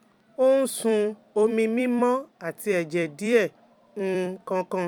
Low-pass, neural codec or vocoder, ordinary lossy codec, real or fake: 19.8 kHz; vocoder, 44.1 kHz, 128 mel bands every 256 samples, BigVGAN v2; none; fake